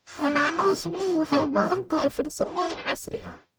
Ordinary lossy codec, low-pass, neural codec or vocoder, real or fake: none; none; codec, 44.1 kHz, 0.9 kbps, DAC; fake